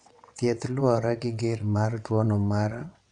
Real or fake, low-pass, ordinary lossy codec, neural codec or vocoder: fake; 9.9 kHz; none; vocoder, 22.05 kHz, 80 mel bands, WaveNeXt